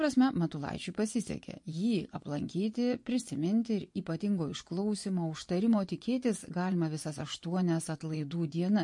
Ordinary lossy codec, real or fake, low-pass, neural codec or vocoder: MP3, 48 kbps; fake; 10.8 kHz; vocoder, 24 kHz, 100 mel bands, Vocos